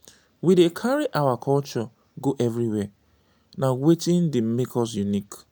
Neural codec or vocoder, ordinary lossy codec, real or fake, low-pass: none; none; real; none